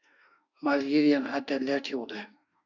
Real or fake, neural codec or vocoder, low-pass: fake; autoencoder, 48 kHz, 32 numbers a frame, DAC-VAE, trained on Japanese speech; 7.2 kHz